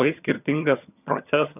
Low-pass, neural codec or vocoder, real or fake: 3.6 kHz; vocoder, 22.05 kHz, 80 mel bands, HiFi-GAN; fake